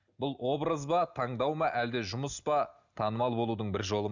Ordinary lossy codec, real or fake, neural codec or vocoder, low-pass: none; real; none; 7.2 kHz